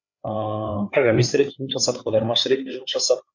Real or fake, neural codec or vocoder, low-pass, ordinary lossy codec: fake; codec, 16 kHz, 4 kbps, FreqCodec, larger model; 7.2 kHz; MP3, 64 kbps